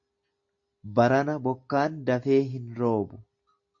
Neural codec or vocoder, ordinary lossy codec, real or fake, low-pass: none; MP3, 48 kbps; real; 7.2 kHz